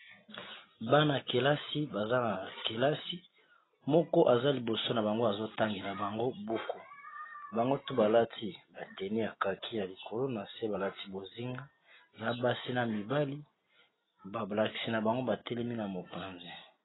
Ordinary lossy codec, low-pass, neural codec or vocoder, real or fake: AAC, 16 kbps; 7.2 kHz; none; real